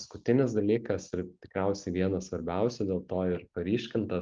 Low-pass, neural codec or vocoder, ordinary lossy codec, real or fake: 7.2 kHz; none; Opus, 32 kbps; real